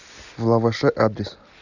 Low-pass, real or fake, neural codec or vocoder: 7.2 kHz; real; none